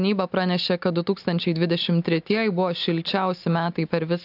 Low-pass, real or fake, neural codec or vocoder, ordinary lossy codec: 5.4 kHz; real; none; AAC, 48 kbps